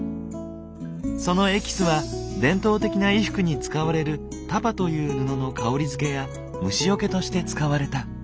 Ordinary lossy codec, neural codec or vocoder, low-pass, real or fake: none; none; none; real